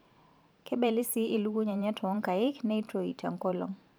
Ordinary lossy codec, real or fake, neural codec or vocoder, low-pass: none; fake; vocoder, 44.1 kHz, 128 mel bands every 512 samples, BigVGAN v2; none